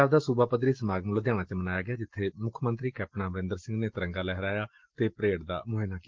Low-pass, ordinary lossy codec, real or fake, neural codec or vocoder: 7.2 kHz; Opus, 16 kbps; real; none